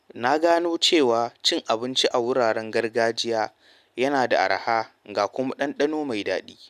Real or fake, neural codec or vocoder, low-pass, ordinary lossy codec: real; none; 14.4 kHz; none